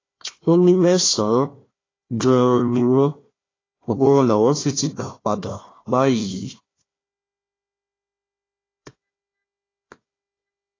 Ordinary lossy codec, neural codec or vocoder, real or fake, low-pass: AAC, 32 kbps; codec, 16 kHz, 1 kbps, FunCodec, trained on Chinese and English, 50 frames a second; fake; 7.2 kHz